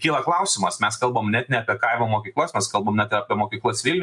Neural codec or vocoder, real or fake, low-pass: none; real; 10.8 kHz